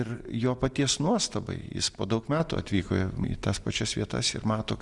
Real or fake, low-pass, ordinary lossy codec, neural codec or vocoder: real; 10.8 kHz; Opus, 64 kbps; none